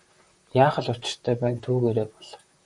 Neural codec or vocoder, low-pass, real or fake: vocoder, 44.1 kHz, 128 mel bands, Pupu-Vocoder; 10.8 kHz; fake